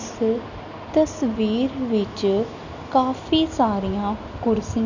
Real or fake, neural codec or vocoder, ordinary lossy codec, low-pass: real; none; none; 7.2 kHz